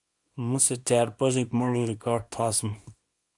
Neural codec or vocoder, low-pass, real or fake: codec, 24 kHz, 0.9 kbps, WavTokenizer, small release; 10.8 kHz; fake